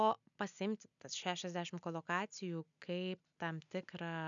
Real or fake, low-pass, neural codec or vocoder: real; 7.2 kHz; none